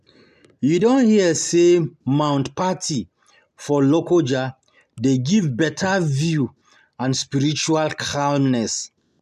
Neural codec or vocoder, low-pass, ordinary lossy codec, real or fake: none; 14.4 kHz; none; real